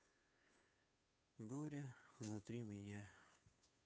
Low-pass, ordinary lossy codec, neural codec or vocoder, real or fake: none; none; codec, 16 kHz, 2 kbps, FunCodec, trained on Chinese and English, 25 frames a second; fake